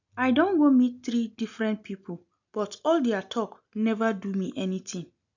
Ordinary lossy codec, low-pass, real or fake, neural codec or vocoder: none; 7.2 kHz; real; none